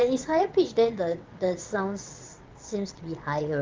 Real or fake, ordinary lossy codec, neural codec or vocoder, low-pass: fake; Opus, 32 kbps; vocoder, 22.05 kHz, 80 mel bands, WaveNeXt; 7.2 kHz